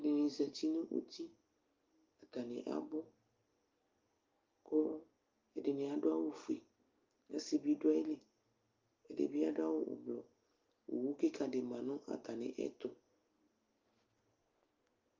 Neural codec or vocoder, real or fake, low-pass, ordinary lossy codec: none; real; 7.2 kHz; Opus, 24 kbps